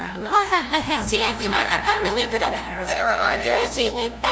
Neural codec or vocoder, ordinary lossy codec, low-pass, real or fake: codec, 16 kHz, 0.5 kbps, FunCodec, trained on LibriTTS, 25 frames a second; none; none; fake